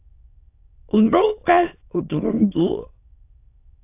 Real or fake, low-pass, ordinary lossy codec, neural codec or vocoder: fake; 3.6 kHz; AAC, 24 kbps; autoencoder, 22.05 kHz, a latent of 192 numbers a frame, VITS, trained on many speakers